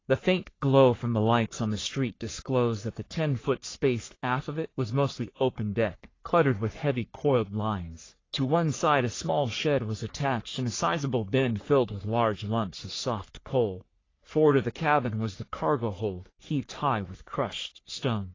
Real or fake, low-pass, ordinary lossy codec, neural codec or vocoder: fake; 7.2 kHz; AAC, 32 kbps; codec, 44.1 kHz, 3.4 kbps, Pupu-Codec